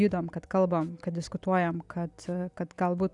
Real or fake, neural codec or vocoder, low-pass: real; none; 10.8 kHz